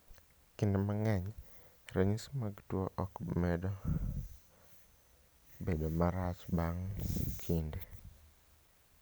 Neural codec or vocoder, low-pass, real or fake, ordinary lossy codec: none; none; real; none